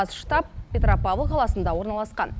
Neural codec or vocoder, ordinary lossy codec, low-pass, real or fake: none; none; none; real